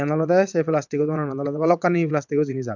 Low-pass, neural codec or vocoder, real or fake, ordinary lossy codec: 7.2 kHz; vocoder, 22.05 kHz, 80 mel bands, WaveNeXt; fake; none